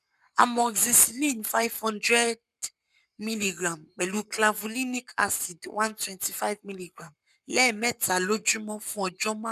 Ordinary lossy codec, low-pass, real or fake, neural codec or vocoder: none; 14.4 kHz; fake; codec, 44.1 kHz, 7.8 kbps, Pupu-Codec